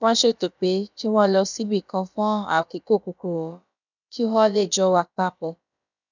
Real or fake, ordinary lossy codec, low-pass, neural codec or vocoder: fake; none; 7.2 kHz; codec, 16 kHz, about 1 kbps, DyCAST, with the encoder's durations